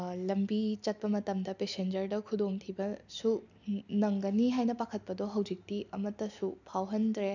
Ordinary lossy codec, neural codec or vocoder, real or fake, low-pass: none; none; real; 7.2 kHz